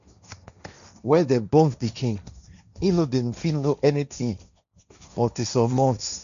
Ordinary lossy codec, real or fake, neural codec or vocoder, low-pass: none; fake; codec, 16 kHz, 1.1 kbps, Voila-Tokenizer; 7.2 kHz